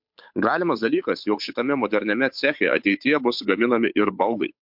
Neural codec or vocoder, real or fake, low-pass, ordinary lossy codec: codec, 16 kHz, 8 kbps, FunCodec, trained on Chinese and English, 25 frames a second; fake; 5.4 kHz; MP3, 48 kbps